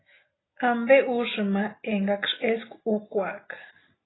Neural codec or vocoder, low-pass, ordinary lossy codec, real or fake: none; 7.2 kHz; AAC, 16 kbps; real